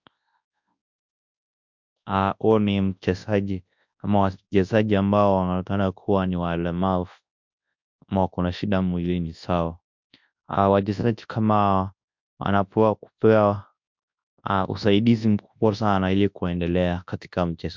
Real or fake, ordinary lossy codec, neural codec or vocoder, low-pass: fake; MP3, 64 kbps; codec, 24 kHz, 0.9 kbps, WavTokenizer, large speech release; 7.2 kHz